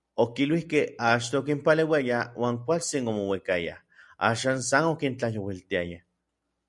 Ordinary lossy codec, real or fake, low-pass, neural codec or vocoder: MP3, 96 kbps; real; 10.8 kHz; none